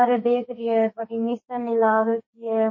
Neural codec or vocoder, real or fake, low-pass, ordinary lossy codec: codec, 44.1 kHz, 2.6 kbps, SNAC; fake; 7.2 kHz; MP3, 32 kbps